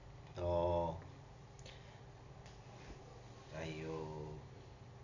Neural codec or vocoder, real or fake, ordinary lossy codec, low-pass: none; real; none; 7.2 kHz